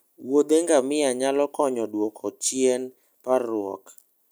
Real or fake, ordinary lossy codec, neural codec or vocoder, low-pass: real; none; none; none